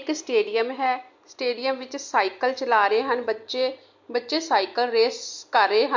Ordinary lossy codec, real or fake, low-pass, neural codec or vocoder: MP3, 48 kbps; real; 7.2 kHz; none